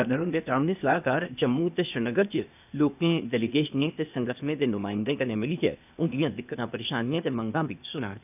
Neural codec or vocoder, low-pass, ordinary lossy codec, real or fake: codec, 16 kHz, 0.8 kbps, ZipCodec; 3.6 kHz; none; fake